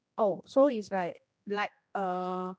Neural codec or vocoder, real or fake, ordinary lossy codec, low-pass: codec, 16 kHz, 1 kbps, X-Codec, HuBERT features, trained on general audio; fake; none; none